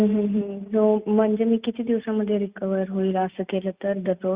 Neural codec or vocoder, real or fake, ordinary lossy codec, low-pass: none; real; Opus, 64 kbps; 3.6 kHz